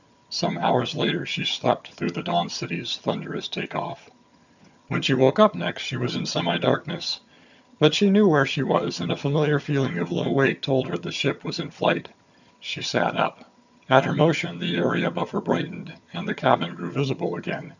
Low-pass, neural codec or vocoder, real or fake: 7.2 kHz; vocoder, 22.05 kHz, 80 mel bands, HiFi-GAN; fake